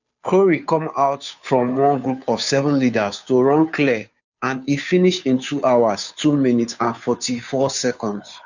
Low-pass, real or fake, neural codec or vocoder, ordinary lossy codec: 7.2 kHz; fake; codec, 16 kHz, 2 kbps, FunCodec, trained on Chinese and English, 25 frames a second; none